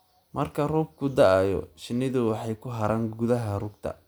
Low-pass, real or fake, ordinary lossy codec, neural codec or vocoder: none; real; none; none